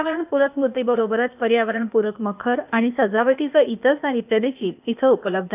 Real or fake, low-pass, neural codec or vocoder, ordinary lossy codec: fake; 3.6 kHz; codec, 16 kHz, 0.8 kbps, ZipCodec; none